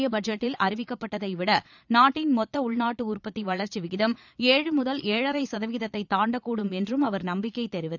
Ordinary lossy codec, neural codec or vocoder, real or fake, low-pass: none; vocoder, 22.05 kHz, 80 mel bands, Vocos; fake; 7.2 kHz